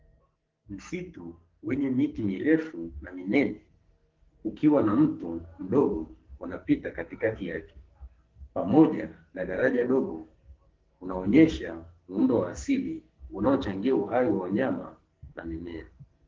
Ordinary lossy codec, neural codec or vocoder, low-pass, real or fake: Opus, 16 kbps; codec, 44.1 kHz, 2.6 kbps, SNAC; 7.2 kHz; fake